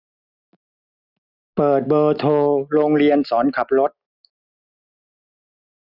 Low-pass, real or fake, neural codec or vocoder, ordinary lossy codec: 5.4 kHz; real; none; none